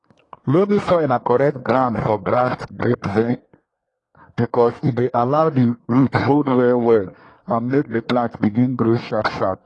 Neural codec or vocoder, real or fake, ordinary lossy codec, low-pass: codec, 24 kHz, 1 kbps, SNAC; fake; AAC, 32 kbps; 10.8 kHz